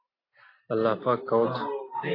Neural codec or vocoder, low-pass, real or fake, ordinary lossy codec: none; 5.4 kHz; real; AAC, 24 kbps